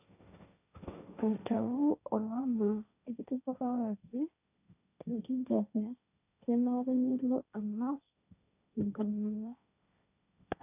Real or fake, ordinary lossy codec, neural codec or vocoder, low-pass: fake; none; codec, 16 kHz, 1.1 kbps, Voila-Tokenizer; 3.6 kHz